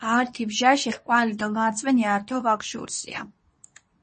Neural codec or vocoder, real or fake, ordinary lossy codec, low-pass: codec, 24 kHz, 0.9 kbps, WavTokenizer, medium speech release version 2; fake; MP3, 32 kbps; 10.8 kHz